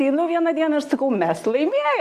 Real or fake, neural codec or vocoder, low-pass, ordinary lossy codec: real; none; 14.4 kHz; Opus, 64 kbps